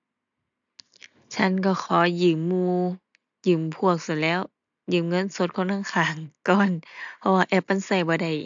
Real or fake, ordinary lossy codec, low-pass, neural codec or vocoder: real; AAC, 64 kbps; 7.2 kHz; none